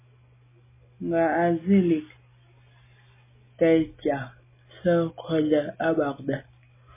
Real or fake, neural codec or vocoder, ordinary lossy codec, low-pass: real; none; MP3, 16 kbps; 3.6 kHz